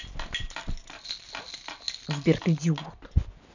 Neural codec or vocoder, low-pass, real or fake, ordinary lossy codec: none; 7.2 kHz; real; none